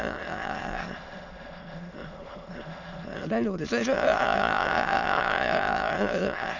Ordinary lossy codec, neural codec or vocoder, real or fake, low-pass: none; autoencoder, 22.05 kHz, a latent of 192 numbers a frame, VITS, trained on many speakers; fake; 7.2 kHz